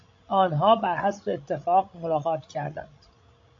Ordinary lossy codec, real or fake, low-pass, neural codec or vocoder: AAC, 48 kbps; fake; 7.2 kHz; codec, 16 kHz, 16 kbps, FreqCodec, larger model